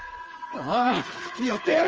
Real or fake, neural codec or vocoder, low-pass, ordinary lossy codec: fake; codec, 16 kHz, 4 kbps, FreqCodec, smaller model; 7.2 kHz; Opus, 24 kbps